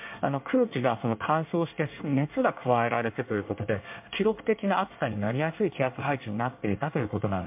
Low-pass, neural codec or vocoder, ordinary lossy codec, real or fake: 3.6 kHz; codec, 24 kHz, 1 kbps, SNAC; MP3, 32 kbps; fake